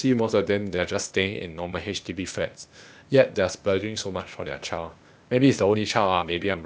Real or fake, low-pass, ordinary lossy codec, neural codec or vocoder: fake; none; none; codec, 16 kHz, 0.8 kbps, ZipCodec